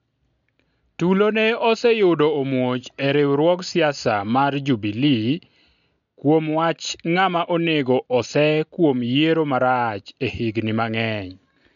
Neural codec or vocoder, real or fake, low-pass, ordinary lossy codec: none; real; 7.2 kHz; none